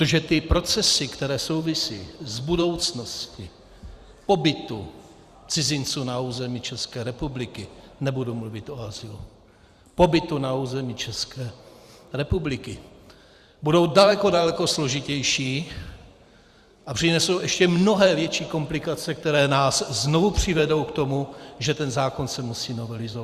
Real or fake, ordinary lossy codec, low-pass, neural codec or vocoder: fake; Opus, 64 kbps; 14.4 kHz; vocoder, 44.1 kHz, 128 mel bands every 512 samples, BigVGAN v2